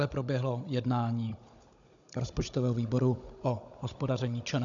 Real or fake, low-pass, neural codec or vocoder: fake; 7.2 kHz; codec, 16 kHz, 16 kbps, FunCodec, trained on Chinese and English, 50 frames a second